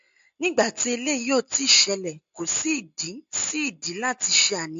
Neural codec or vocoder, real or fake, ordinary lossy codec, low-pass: none; real; MP3, 48 kbps; 7.2 kHz